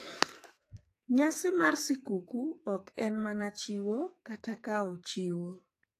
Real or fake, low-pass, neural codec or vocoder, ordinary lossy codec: fake; 14.4 kHz; codec, 44.1 kHz, 2.6 kbps, SNAC; AAC, 64 kbps